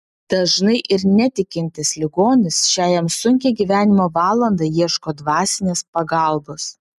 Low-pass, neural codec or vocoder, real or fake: 14.4 kHz; none; real